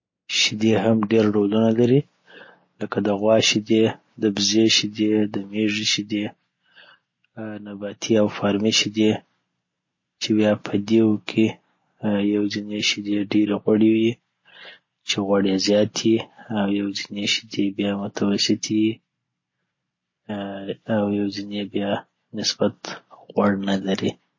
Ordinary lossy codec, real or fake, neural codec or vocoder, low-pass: MP3, 32 kbps; real; none; 7.2 kHz